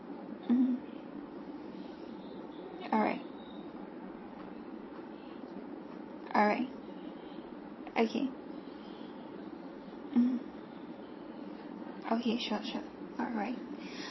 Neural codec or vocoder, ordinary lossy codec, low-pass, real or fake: vocoder, 22.05 kHz, 80 mel bands, Vocos; MP3, 24 kbps; 7.2 kHz; fake